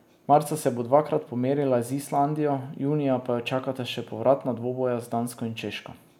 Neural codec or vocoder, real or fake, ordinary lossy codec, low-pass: none; real; none; 19.8 kHz